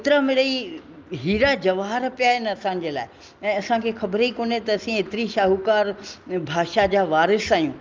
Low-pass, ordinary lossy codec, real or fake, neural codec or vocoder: 7.2 kHz; Opus, 32 kbps; real; none